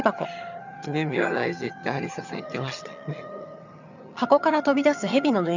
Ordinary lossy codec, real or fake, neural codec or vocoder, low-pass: none; fake; vocoder, 22.05 kHz, 80 mel bands, HiFi-GAN; 7.2 kHz